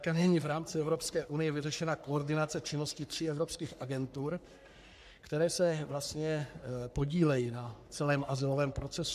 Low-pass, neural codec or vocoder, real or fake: 14.4 kHz; codec, 44.1 kHz, 3.4 kbps, Pupu-Codec; fake